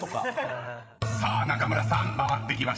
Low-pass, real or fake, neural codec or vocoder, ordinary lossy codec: none; fake; codec, 16 kHz, 16 kbps, FreqCodec, larger model; none